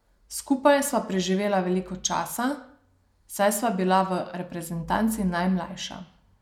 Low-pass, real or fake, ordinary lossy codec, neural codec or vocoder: 19.8 kHz; real; none; none